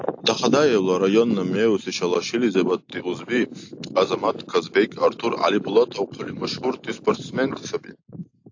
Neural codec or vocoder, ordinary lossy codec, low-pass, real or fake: none; AAC, 48 kbps; 7.2 kHz; real